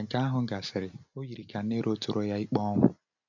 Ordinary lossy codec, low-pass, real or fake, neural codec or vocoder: none; 7.2 kHz; real; none